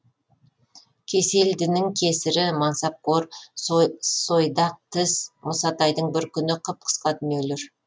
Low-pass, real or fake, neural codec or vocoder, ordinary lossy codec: none; real; none; none